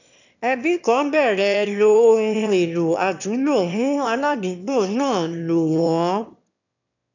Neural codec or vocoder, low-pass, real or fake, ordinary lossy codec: autoencoder, 22.05 kHz, a latent of 192 numbers a frame, VITS, trained on one speaker; 7.2 kHz; fake; none